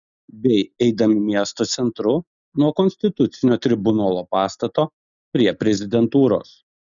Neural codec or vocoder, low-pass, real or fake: none; 7.2 kHz; real